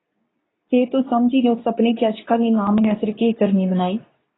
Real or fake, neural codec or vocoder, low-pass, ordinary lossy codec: fake; codec, 24 kHz, 0.9 kbps, WavTokenizer, medium speech release version 2; 7.2 kHz; AAC, 16 kbps